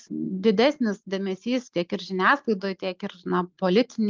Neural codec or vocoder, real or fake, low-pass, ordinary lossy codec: none; real; 7.2 kHz; Opus, 24 kbps